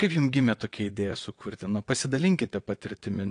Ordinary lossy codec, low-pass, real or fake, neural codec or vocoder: AAC, 48 kbps; 9.9 kHz; fake; vocoder, 22.05 kHz, 80 mel bands, WaveNeXt